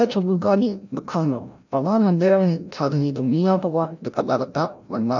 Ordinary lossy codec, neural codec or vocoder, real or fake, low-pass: none; codec, 16 kHz, 0.5 kbps, FreqCodec, larger model; fake; 7.2 kHz